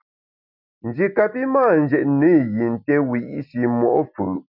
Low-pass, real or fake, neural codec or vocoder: 5.4 kHz; real; none